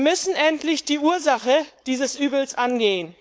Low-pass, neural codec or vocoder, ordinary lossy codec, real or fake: none; codec, 16 kHz, 4.8 kbps, FACodec; none; fake